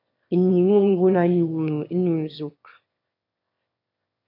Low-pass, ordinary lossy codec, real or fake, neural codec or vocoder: 5.4 kHz; MP3, 48 kbps; fake; autoencoder, 22.05 kHz, a latent of 192 numbers a frame, VITS, trained on one speaker